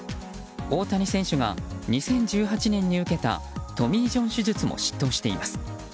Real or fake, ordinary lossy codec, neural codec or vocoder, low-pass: real; none; none; none